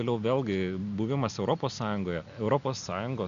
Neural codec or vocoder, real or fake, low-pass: none; real; 7.2 kHz